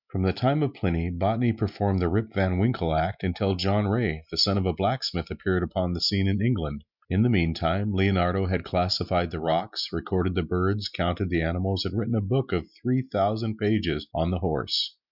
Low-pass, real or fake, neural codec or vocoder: 5.4 kHz; real; none